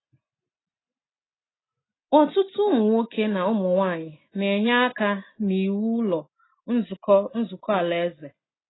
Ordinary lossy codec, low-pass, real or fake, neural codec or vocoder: AAC, 16 kbps; 7.2 kHz; real; none